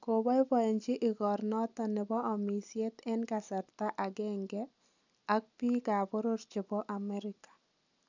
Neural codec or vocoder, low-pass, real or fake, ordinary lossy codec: none; 7.2 kHz; real; none